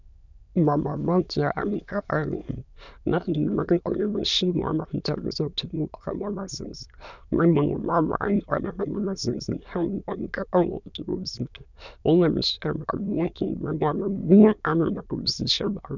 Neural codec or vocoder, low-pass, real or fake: autoencoder, 22.05 kHz, a latent of 192 numbers a frame, VITS, trained on many speakers; 7.2 kHz; fake